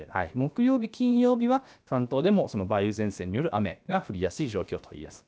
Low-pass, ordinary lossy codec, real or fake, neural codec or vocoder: none; none; fake; codec, 16 kHz, about 1 kbps, DyCAST, with the encoder's durations